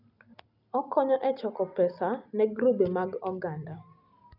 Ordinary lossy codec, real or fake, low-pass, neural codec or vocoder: none; real; 5.4 kHz; none